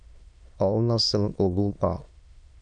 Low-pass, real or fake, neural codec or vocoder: 9.9 kHz; fake; autoencoder, 22.05 kHz, a latent of 192 numbers a frame, VITS, trained on many speakers